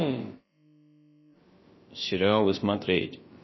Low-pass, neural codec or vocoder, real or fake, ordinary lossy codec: 7.2 kHz; codec, 16 kHz, about 1 kbps, DyCAST, with the encoder's durations; fake; MP3, 24 kbps